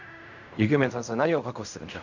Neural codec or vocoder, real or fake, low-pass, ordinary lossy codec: codec, 16 kHz in and 24 kHz out, 0.4 kbps, LongCat-Audio-Codec, fine tuned four codebook decoder; fake; 7.2 kHz; none